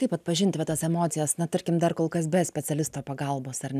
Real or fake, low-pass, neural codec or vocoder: real; 14.4 kHz; none